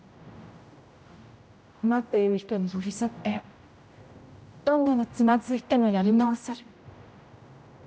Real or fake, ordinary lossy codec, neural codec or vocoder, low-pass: fake; none; codec, 16 kHz, 0.5 kbps, X-Codec, HuBERT features, trained on general audio; none